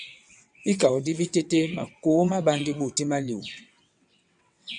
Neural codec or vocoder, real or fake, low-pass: vocoder, 22.05 kHz, 80 mel bands, WaveNeXt; fake; 9.9 kHz